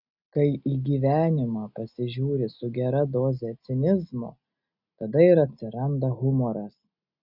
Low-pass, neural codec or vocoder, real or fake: 5.4 kHz; none; real